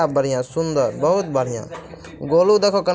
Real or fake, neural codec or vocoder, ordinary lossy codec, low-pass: real; none; none; none